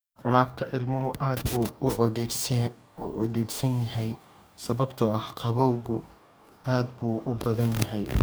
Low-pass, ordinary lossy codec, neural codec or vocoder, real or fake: none; none; codec, 44.1 kHz, 2.6 kbps, DAC; fake